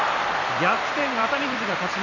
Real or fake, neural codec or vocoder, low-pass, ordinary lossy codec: fake; vocoder, 44.1 kHz, 128 mel bands every 256 samples, BigVGAN v2; 7.2 kHz; none